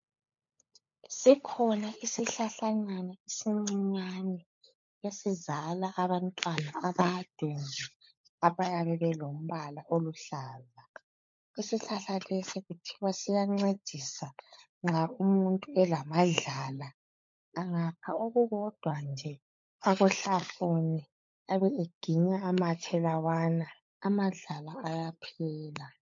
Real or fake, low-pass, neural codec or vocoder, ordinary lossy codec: fake; 7.2 kHz; codec, 16 kHz, 16 kbps, FunCodec, trained on LibriTTS, 50 frames a second; MP3, 48 kbps